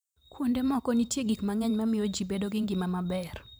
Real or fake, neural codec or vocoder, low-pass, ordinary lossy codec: fake; vocoder, 44.1 kHz, 128 mel bands every 512 samples, BigVGAN v2; none; none